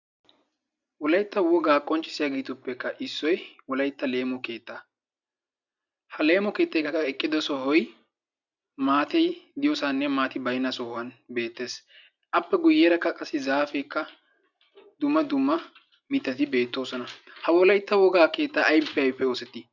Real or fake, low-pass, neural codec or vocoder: real; 7.2 kHz; none